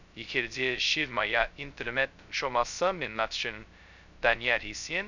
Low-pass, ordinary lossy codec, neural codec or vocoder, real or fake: 7.2 kHz; none; codec, 16 kHz, 0.2 kbps, FocalCodec; fake